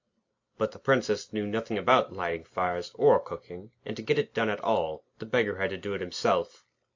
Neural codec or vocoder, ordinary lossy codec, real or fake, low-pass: none; AAC, 48 kbps; real; 7.2 kHz